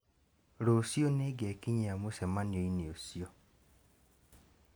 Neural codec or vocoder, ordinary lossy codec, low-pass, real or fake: none; none; none; real